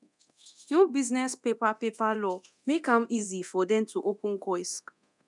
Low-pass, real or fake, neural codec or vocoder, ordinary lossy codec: 10.8 kHz; fake; codec, 24 kHz, 0.9 kbps, DualCodec; none